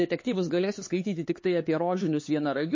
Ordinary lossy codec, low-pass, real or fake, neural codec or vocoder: MP3, 32 kbps; 7.2 kHz; fake; codec, 16 kHz, 4 kbps, X-Codec, HuBERT features, trained on LibriSpeech